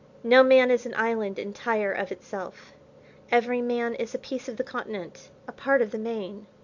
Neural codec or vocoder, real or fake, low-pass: none; real; 7.2 kHz